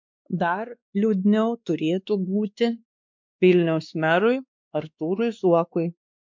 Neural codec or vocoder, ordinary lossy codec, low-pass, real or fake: codec, 16 kHz, 2 kbps, X-Codec, WavLM features, trained on Multilingual LibriSpeech; MP3, 64 kbps; 7.2 kHz; fake